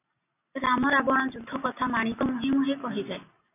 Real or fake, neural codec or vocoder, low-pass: real; none; 3.6 kHz